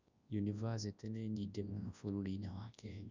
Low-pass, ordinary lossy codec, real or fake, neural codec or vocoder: 7.2 kHz; Opus, 64 kbps; fake; codec, 24 kHz, 0.9 kbps, WavTokenizer, large speech release